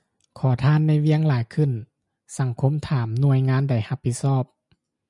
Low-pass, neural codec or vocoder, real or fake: 10.8 kHz; none; real